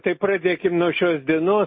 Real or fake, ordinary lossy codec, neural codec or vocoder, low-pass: real; MP3, 24 kbps; none; 7.2 kHz